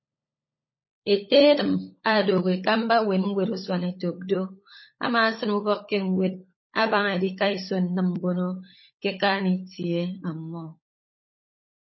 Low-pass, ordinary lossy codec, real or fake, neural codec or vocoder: 7.2 kHz; MP3, 24 kbps; fake; codec, 16 kHz, 16 kbps, FunCodec, trained on LibriTTS, 50 frames a second